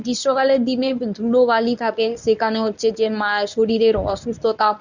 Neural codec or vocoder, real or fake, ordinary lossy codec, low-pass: codec, 24 kHz, 0.9 kbps, WavTokenizer, medium speech release version 1; fake; none; 7.2 kHz